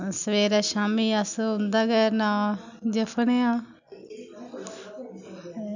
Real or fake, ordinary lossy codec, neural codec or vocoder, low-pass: real; none; none; 7.2 kHz